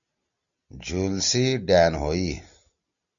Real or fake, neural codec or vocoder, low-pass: real; none; 7.2 kHz